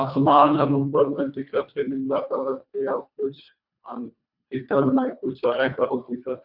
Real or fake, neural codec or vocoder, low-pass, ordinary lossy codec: fake; codec, 24 kHz, 1.5 kbps, HILCodec; 5.4 kHz; none